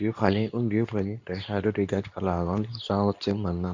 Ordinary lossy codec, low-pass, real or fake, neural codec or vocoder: none; 7.2 kHz; fake; codec, 24 kHz, 0.9 kbps, WavTokenizer, medium speech release version 2